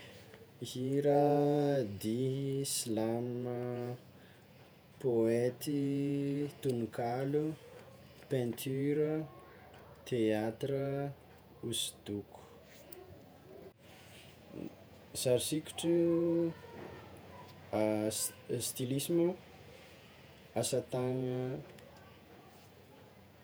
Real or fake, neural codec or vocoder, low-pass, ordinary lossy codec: fake; vocoder, 48 kHz, 128 mel bands, Vocos; none; none